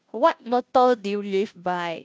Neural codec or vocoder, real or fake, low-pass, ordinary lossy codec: codec, 16 kHz, 0.5 kbps, FunCodec, trained on Chinese and English, 25 frames a second; fake; none; none